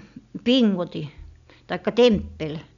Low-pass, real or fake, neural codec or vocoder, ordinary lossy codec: 7.2 kHz; real; none; none